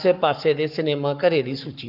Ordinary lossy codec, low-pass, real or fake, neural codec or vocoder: none; 5.4 kHz; fake; codec, 44.1 kHz, 7.8 kbps, Pupu-Codec